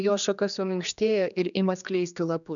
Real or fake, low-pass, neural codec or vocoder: fake; 7.2 kHz; codec, 16 kHz, 2 kbps, X-Codec, HuBERT features, trained on general audio